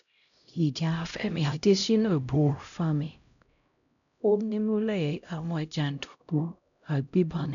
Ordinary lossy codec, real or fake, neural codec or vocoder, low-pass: none; fake; codec, 16 kHz, 0.5 kbps, X-Codec, HuBERT features, trained on LibriSpeech; 7.2 kHz